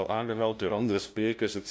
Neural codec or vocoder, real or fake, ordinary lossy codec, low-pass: codec, 16 kHz, 0.5 kbps, FunCodec, trained on LibriTTS, 25 frames a second; fake; none; none